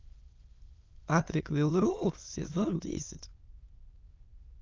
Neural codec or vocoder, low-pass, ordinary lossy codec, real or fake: autoencoder, 22.05 kHz, a latent of 192 numbers a frame, VITS, trained on many speakers; 7.2 kHz; Opus, 32 kbps; fake